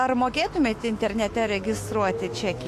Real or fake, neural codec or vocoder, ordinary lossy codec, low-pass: fake; autoencoder, 48 kHz, 128 numbers a frame, DAC-VAE, trained on Japanese speech; AAC, 64 kbps; 14.4 kHz